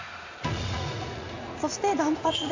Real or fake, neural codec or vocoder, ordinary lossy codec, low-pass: fake; vocoder, 44.1 kHz, 80 mel bands, Vocos; AAC, 48 kbps; 7.2 kHz